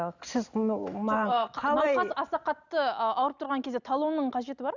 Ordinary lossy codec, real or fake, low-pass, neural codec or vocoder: none; real; 7.2 kHz; none